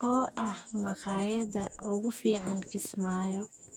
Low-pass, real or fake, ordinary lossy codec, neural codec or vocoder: none; fake; none; codec, 44.1 kHz, 2.6 kbps, DAC